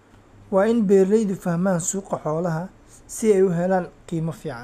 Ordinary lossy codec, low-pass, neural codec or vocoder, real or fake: none; 14.4 kHz; none; real